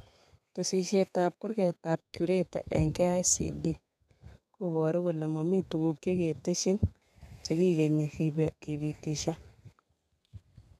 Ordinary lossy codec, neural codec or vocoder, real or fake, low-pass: none; codec, 32 kHz, 1.9 kbps, SNAC; fake; 14.4 kHz